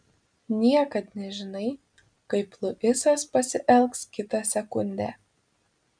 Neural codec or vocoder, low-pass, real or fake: none; 9.9 kHz; real